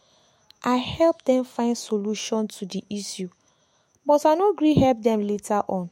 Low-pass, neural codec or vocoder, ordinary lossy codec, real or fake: 19.8 kHz; autoencoder, 48 kHz, 128 numbers a frame, DAC-VAE, trained on Japanese speech; MP3, 64 kbps; fake